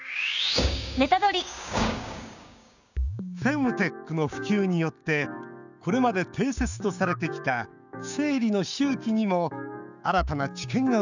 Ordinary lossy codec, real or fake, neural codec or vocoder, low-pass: none; fake; codec, 16 kHz, 6 kbps, DAC; 7.2 kHz